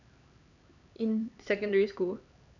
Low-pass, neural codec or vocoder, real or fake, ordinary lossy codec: 7.2 kHz; codec, 16 kHz, 4 kbps, X-Codec, HuBERT features, trained on LibriSpeech; fake; none